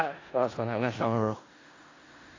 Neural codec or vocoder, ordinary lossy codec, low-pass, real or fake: codec, 16 kHz in and 24 kHz out, 0.4 kbps, LongCat-Audio-Codec, four codebook decoder; AAC, 32 kbps; 7.2 kHz; fake